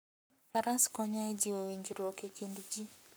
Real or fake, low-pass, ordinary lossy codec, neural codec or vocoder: fake; none; none; codec, 44.1 kHz, 7.8 kbps, Pupu-Codec